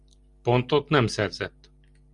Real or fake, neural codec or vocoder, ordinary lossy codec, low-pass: real; none; Opus, 64 kbps; 10.8 kHz